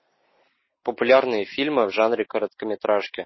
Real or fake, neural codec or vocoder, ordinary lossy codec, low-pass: real; none; MP3, 24 kbps; 7.2 kHz